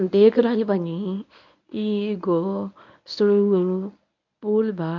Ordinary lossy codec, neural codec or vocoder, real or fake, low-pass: none; codec, 24 kHz, 0.9 kbps, WavTokenizer, medium speech release version 1; fake; 7.2 kHz